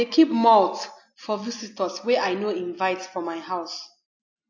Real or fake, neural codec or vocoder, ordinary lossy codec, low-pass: real; none; AAC, 48 kbps; 7.2 kHz